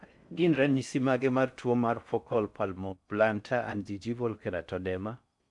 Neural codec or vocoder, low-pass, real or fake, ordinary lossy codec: codec, 16 kHz in and 24 kHz out, 0.6 kbps, FocalCodec, streaming, 4096 codes; 10.8 kHz; fake; none